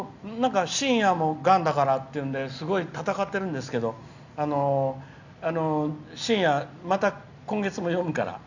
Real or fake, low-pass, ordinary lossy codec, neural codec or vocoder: real; 7.2 kHz; none; none